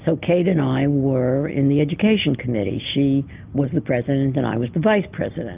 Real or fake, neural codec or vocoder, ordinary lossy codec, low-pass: real; none; Opus, 24 kbps; 3.6 kHz